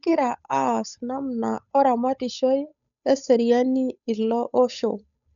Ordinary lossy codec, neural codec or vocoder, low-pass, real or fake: none; codec, 16 kHz, 8 kbps, FunCodec, trained on Chinese and English, 25 frames a second; 7.2 kHz; fake